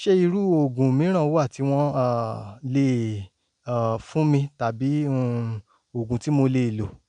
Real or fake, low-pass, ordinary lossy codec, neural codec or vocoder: real; 9.9 kHz; none; none